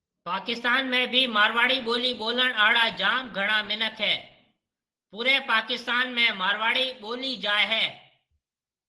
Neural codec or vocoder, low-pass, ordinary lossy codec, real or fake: none; 10.8 kHz; Opus, 16 kbps; real